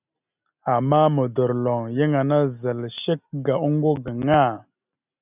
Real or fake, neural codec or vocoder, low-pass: real; none; 3.6 kHz